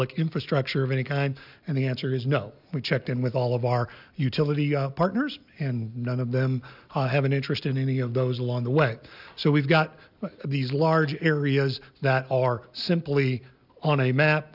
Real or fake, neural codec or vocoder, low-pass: real; none; 5.4 kHz